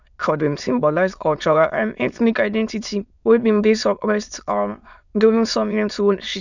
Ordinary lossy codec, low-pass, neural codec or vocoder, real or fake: none; 7.2 kHz; autoencoder, 22.05 kHz, a latent of 192 numbers a frame, VITS, trained on many speakers; fake